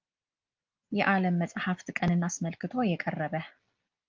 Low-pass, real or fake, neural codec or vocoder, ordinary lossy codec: 7.2 kHz; real; none; Opus, 32 kbps